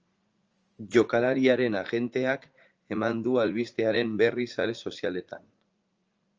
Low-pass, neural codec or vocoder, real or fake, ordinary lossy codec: 7.2 kHz; vocoder, 44.1 kHz, 80 mel bands, Vocos; fake; Opus, 24 kbps